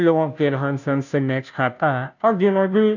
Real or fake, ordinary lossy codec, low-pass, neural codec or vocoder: fake; none; 7.2 kHz; codec, 16 kHz, 0.5 kbps, FunCodec, trained on Chinese and English, 25 frames a second